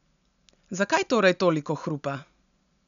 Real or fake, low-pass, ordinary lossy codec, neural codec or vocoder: real; 7.2 kHz; none; none